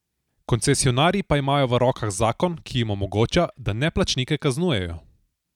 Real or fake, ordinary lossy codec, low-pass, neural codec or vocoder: real; none; 19.8 kHz; none